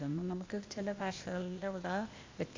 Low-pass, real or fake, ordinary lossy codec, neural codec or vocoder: 7.2 kHz; fake; MP3, 48 kbps; codec, 16 kHz, 0.8 kbps, ZipCodec